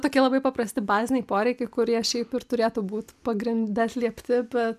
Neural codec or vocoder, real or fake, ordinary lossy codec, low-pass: none; real; MP3, 96 kbps; 14.4 kHz